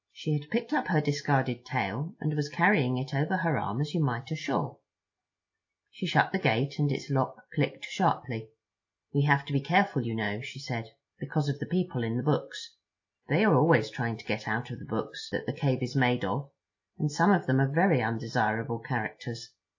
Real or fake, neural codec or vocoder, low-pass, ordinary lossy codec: real; none; 7.2 kHz; AAC, 48 kbps